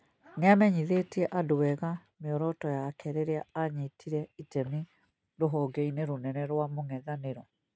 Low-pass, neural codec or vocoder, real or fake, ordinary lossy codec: none; none; real; none